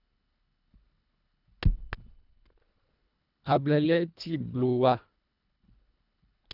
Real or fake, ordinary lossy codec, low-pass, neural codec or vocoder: fake; none; 5.4 kHz; codec, 24 kHz, 1.5 kbps, HILCodec